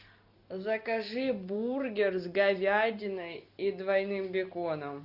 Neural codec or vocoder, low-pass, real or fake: none; 5.4 kHz; real